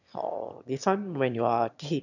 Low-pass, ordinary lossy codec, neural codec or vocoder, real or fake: 7.2 kHz; none; autoencoder, 22.05 kHz, a latent of 192 numbers a frame, VITS, trained on one speaker; fake